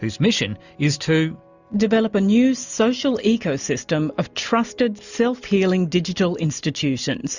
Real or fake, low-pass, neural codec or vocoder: real; 7.2 kHz; none